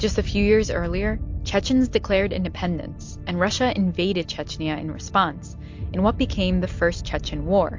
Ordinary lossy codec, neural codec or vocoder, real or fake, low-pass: MP3, 48 kbps; none; real; 7.2 kHz